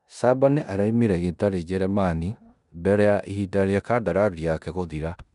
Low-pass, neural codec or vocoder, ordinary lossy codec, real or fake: 10.8 kHz; codec, 16 kHz in and 24 kHz out, 0.9 kbps, LongCat-Audio-Codec, four codebook decoder; none; fake